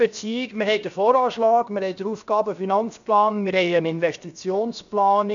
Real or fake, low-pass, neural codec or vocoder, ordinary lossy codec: fake; 7.2 kHz; codec, 16 kHz, 0.7 kbps, FocalCodec; none